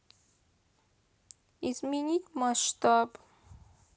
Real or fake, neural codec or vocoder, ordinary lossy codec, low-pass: real; none; none; none